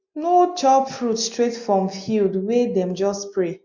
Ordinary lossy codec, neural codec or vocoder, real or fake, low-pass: MP3, 48 kbps; none; real; 7.2 kHz